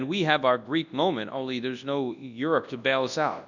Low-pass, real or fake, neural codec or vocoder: 7.2 kHz; fake; codec, 24 kHz, 0.9 kbps, WavTokenizer, large speech release